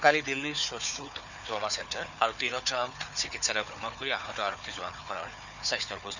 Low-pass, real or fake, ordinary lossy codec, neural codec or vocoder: 7.2 kHz; fake; none; codec, 16 kHz, 4 kbps, FunCodec, trained on Chinese and English, 50 frames a second